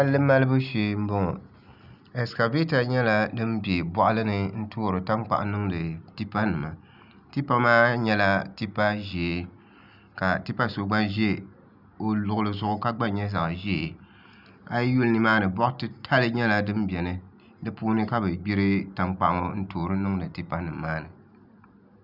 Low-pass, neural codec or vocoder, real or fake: 5.4 kHz; none; real